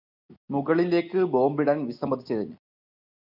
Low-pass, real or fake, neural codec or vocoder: 5.4 kHz; real; none